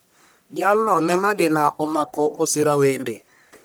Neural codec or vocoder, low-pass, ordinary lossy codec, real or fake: codec, 44.1 kHz, 1.7 kbps, Pupu-Codec; none; none; fake